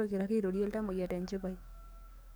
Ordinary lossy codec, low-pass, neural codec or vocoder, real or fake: none; none; codec, 44.1 kHz, 7.8 kbps, DAC; fake